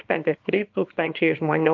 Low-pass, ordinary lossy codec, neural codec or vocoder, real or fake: 7.2 kHz; Opus, 32 kbps; codec, 24 kHz, 0.9 kbps, WavTokenizer, small release; fake